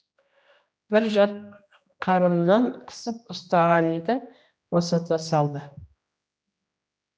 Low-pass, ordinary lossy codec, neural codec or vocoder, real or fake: none; none; codec, 16 kHz, 1 kbps, X-Codec, HuBERT features, trained on general audio; fake